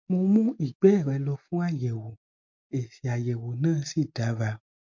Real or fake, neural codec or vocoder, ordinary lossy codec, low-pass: real; none; MP3, 48 kbps; 7.2 kHz